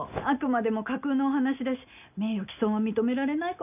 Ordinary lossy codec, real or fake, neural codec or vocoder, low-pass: none; real; none; 3.6 kHz